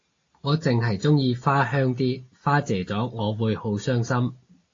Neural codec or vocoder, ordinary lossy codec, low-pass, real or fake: none; AAC, 32 kbps; 7.2 kHz; real